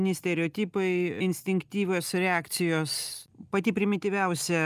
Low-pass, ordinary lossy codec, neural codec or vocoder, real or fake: 14.4 kHz; Opus, 32 kbps; none; real